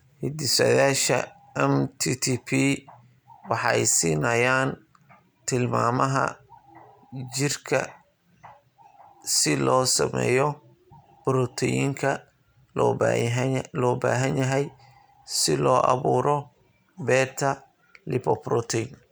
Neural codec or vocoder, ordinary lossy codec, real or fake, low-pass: none; none; real; none